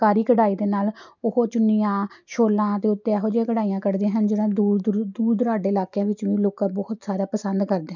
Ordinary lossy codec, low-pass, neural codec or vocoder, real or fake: none; 7.2 kHz; none; real